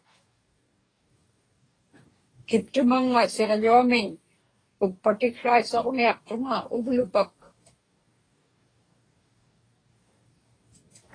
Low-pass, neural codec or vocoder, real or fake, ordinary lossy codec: 9.9 kHz; codec, 44.1 kHz, 2.6 kbps, DAC; fake; AAC, 32 kbps